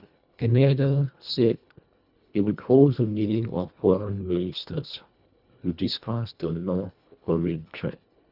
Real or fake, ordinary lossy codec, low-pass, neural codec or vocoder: fake; none; 5.4 kHz; codec, 24 kHz, 1.5 kbps, HILCodec